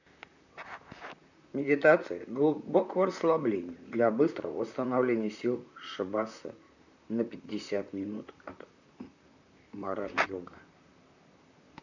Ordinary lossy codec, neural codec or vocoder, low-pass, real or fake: none; vocoder, 44.1 kHz, 128 mel bands, Pupu-Vocoder; 7.2 kHz; fake